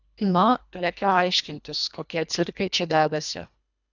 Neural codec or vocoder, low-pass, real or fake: codec, 24 kHz, 1.5 kbps, HILCodec; 7.2 kHz; fake